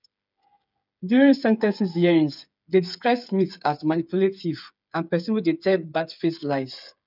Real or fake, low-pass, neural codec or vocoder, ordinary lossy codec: fake; 5.4 kHz; codec, 16 kHz, 8 kbps, FreqCodec, smaller model; none